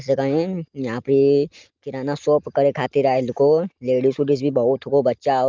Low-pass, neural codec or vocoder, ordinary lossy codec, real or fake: 7.2 kHz; none; Opus, 32 kbps; real